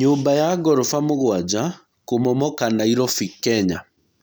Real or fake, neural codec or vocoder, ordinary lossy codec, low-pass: real; none; none; none